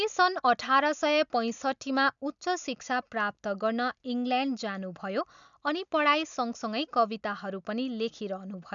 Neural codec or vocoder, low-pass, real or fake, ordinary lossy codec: none; 7.2 kHz; real; none